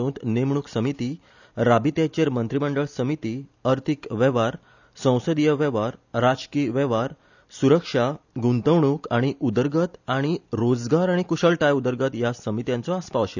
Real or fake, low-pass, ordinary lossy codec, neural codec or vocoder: real; 7.2 kHz; none; none